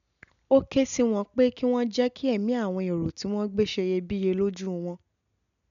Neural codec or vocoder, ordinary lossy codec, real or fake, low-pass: none; none; real; 7.2 kHz